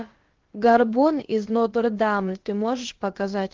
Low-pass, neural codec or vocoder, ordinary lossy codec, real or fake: 7.2 kHz; codec, 16 kHz, about 1 kbps, DyCAST, with the encoder's durations; Opus, 16 kbps; fake